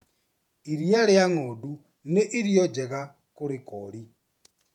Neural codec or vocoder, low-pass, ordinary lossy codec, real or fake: vocoder, 48 kHz, 128 mel bands, Vocos; 19.8 kHz; MP3, 96 kbps; fake